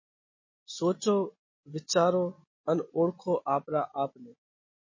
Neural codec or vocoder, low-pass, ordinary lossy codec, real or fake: none; 7.2 kHz; MP3, 32 kbps; real